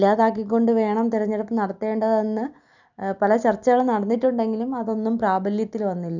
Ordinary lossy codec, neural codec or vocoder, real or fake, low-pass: none; none; real; 7.2 kHz